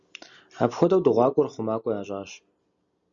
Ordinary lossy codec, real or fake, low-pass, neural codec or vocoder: Opus, 64 kbps; real; 7.2 kHz; none